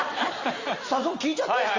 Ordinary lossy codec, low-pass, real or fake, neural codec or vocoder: Opus, 32 kbps; 7.2 kHz; real; none